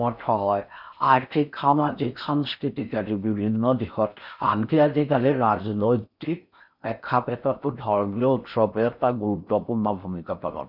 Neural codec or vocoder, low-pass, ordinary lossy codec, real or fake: codec, 16 kHz in and 24 kHz out, 0.6 kbps, FocalCodec, streaming, 4096 codes; 5.4 kHz; none; fake